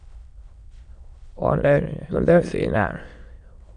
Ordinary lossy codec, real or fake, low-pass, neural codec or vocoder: Opus, 64 kbps; fake; 9.9 kHz; autoencoder, 22.05 kHz, a latent of 192 numbers a frame, VITS, trained on many speakers